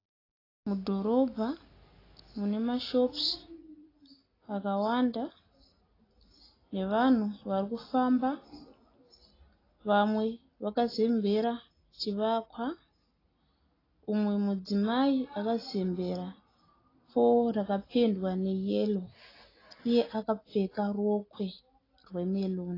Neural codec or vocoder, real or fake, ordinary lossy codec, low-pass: none; real; AAC, 24 kbps; 5.4 kHz